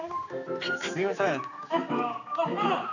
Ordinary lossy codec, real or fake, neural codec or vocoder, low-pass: none; fake; codec, 16 kHz, 4 kbps, X-Codec, HuBERT features, trained on general audio; 7.2 kHz